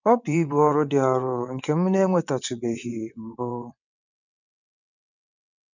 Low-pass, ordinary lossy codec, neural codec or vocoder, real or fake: 7.2 kHz; none; vocoder, 22.05 kHz, 80 mel bands, WaveNeXt; fake